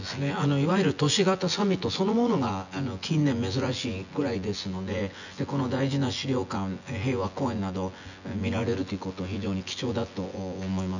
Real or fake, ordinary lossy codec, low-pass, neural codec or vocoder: fake; none; 7.2 kHz; vocoder, 24 kHz, 100 mel bands, Vocos